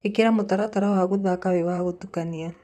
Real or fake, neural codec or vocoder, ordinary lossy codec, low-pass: fake; vocoder, 44.1 kHz, 128 mel bands, Pupu-Vocoder; none; 14.4 kHz